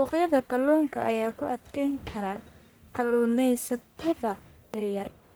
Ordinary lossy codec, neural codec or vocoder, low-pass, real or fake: none; codec, 44.1 kHz, 1.7 kbps, Pupu-Codec; none; fake